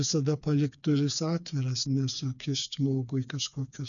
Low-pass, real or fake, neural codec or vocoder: 7.2 kHz; fake; codec, 16 kHz, 4 kbps, FreqCodec, smaller model